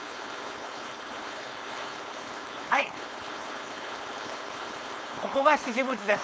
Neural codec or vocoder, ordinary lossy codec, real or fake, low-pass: codec, 16 kHz, 4.8 kbps, FACodec; none; fake; none